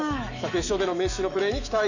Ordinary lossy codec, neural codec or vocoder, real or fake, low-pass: none; autoencoder, 48 kHz, 128 numbers a frame, DAC-VAE, trained on Japanese speech; fake; 7.2 kHz